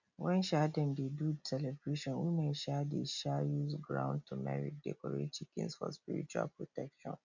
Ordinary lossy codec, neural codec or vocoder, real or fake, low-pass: none; none; real; 7.2 kHz